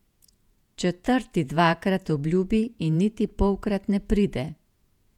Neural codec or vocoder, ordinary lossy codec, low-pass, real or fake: vocoder, 48 kHz, 128 mel bands, Vocos; none; 19.8 kHz; fake